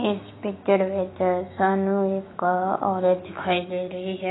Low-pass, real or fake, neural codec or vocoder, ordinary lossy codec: 7.2 kHz; fake; autoencoder, 48 kHz, 128 numbers a frame, DAC-VAE, trained on Japanese speech; AAC, 16 kbps